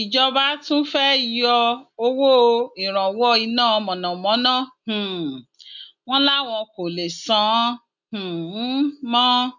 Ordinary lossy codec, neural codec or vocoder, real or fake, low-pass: none; none; real; 7.2 kHz